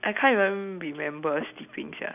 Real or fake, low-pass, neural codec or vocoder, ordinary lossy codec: real; 3.6 kHz; none; none